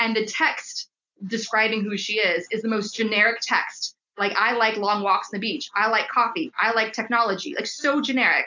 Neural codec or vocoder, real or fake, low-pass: none; real; 7.2 kHz